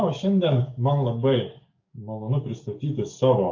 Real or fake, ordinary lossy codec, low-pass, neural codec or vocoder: fake; MP3, 48 kbps; 7.2 kHz; codec, 44.1 kHz, 7.8 kbps, DAC